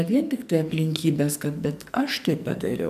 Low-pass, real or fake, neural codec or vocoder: 14.4 kHz; fake; codec, 44.1 kHz, 2.6 kbps, SNAC